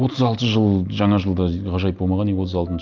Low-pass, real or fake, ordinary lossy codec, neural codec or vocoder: 7.2 kHz; real; Opus, 24 kbps; none